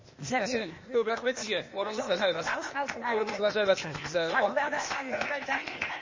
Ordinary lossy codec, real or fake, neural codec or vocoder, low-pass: MP3, 32 kbps; fake; codec, 16 kHz, 0.8 kbps, ZipCodec; 7.2 kHz